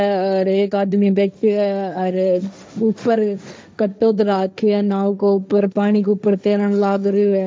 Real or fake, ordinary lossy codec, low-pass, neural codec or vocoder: fake; none; none; codec, 16 kHz, 1.1 kbps, Voila-Tokenizer